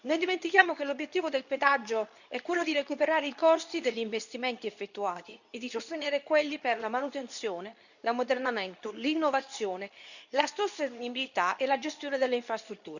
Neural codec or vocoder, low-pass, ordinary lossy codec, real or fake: codec, 24 kHz, 0.9 kbps, WavTokenizer, medium speech release version 2; 7.2 kHz; none; fake